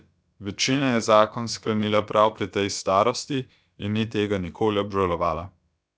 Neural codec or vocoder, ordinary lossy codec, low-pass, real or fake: codec, 16 kHz, about 1 kbps, DyCAST, with the encoder's durations; none; none; fake